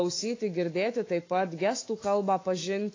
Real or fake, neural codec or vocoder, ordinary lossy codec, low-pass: fake; autoencoder, 48 kHz, 128 numbers a frame, DAC-VAE, trained on Japanese speech; AAC, 32 kbps; 7.2 kHz